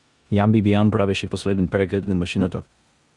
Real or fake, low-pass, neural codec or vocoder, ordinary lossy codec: fake; 10.8 kHz; codec, 16 kHz in and 24 kHz out, 0.9 kbps, LongCat-Audio-Codec, four codebook decoder; Opus, 64 kbps